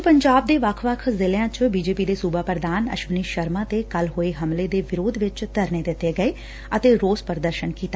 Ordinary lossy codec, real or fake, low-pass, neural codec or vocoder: none; real; none; none